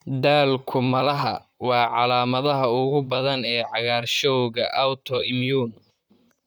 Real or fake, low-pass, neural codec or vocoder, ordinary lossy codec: fake; none; vocoder, 44.1 kHz, 128 mel bands, Pupu-Vocoder; none